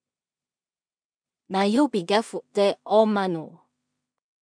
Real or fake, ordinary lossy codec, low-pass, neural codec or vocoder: fake; MP3, 96 kbps; 9.9 kHz; codec, 16 kHz in and 24 kHz out, 0.4 kbps, LongCat-Audio-Codec, two codebook decoder